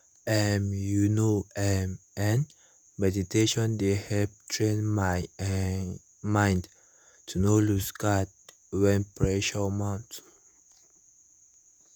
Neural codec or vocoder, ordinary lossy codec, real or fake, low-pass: vocoder, 48 kHz, 128 mel bands, Vocos; none; fake; none